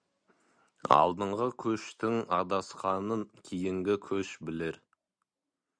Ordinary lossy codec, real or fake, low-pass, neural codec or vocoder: Opus, 64 kbps; real; 9.9 kHz; none